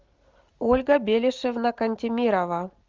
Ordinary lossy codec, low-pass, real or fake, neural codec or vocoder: Opus, 32 kbps; 7.2 kHz; real; none